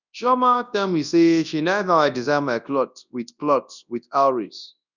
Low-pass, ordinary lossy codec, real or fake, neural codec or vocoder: 7.2 kHz; none; fake; codec, 24 kHz, 0.9 kbps, WavTokenizer, large speech release